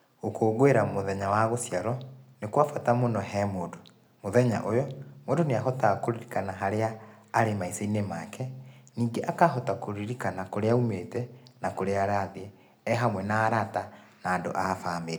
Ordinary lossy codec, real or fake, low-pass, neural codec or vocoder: none; real; none; none